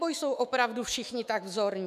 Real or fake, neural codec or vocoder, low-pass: fake; autoencoder, 48 kHz, 128 numbers a frame, DAC-VAE, trained on Japanese speech; 14.4 kHz